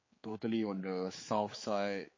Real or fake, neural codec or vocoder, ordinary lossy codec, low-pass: fake; codec, 16 kHz, 4 kbps, X-Codec, HuBERT features, trained on general audio; MP3, 32 kbps; 7.2 kHz